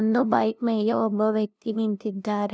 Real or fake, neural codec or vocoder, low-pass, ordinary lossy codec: fake; codec, 16 kHz, 0.5 kbps, FunCodec, trained on LibriTTS, 25 frames a second; none; none